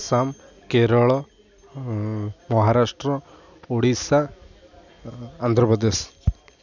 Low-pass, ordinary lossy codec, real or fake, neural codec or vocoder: 7.2 kHz; none; real; none